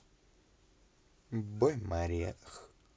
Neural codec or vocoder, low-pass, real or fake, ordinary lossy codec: none; none; real; none